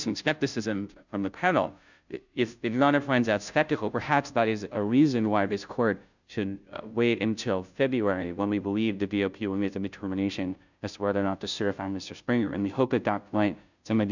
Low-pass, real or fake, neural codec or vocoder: 7.2 kHz; fake; codec, 16 kHz, 0.5 kbps, FunCodec, trained on Chinese and English, 25 frames a second